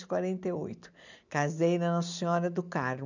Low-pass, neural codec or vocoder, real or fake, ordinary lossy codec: 7.2 kHz; none; real; none